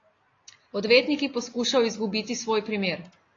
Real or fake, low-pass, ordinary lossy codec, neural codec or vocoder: real; 7.2 kHz; AAC, 32 kbps; none